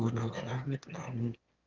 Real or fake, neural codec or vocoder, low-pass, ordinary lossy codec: fake; autoencoder, 22.05 kHz, a latent of 192 numbers a frame, VITS, trained on one speaker; 7.2 kHz; Opus, 24 kbps